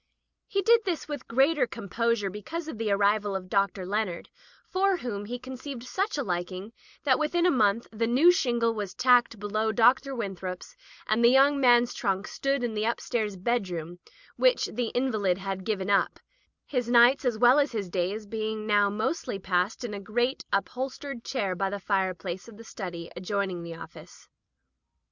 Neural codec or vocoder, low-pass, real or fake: none; 7.2 kHz; real